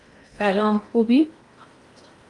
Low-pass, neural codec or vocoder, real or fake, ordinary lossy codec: 10.8 kHz; codec, 16 kHz in and 24 kHz out, 0.8 kbps, FocalCodec, streaming, 65536 codes; fake; Opus, 32 kbps